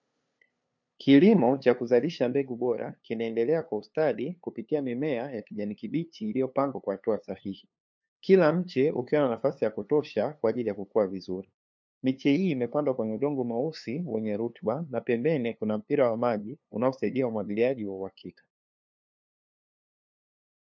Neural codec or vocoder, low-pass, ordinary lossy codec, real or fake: codec, 16 kHz, 2 kbps, FunCodec, trained on LibriTTS, 25 frames a second; 7.2 kHz; MP3, 64 kbps; fake